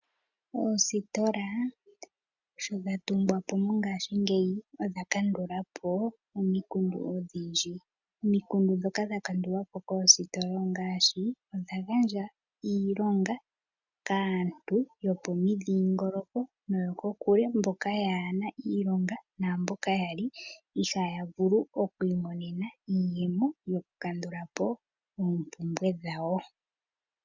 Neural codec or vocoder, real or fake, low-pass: none; real; 7.2 kHz